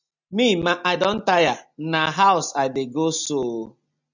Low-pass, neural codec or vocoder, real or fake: 7.2 kHz; none; real